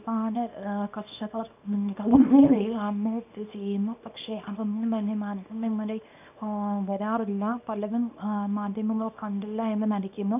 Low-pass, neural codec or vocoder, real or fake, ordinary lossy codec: 3.6 kHz; codec, 24 kHz, 0.9 kbps, WavTokenizer, small release; fake; none